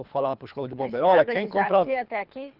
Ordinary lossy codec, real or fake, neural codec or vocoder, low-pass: Opus, 24 kbps; fake; codec, 24 kHz, 3 kbps, HILCodec; 5.4 kHz